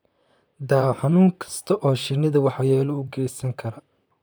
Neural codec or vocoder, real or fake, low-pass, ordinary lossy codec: vocoder, 44.1 kHz, 128 mel bands, Pupu-Vocoder; fake; none; none